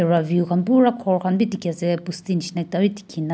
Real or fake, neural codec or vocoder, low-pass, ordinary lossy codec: real; none; none; none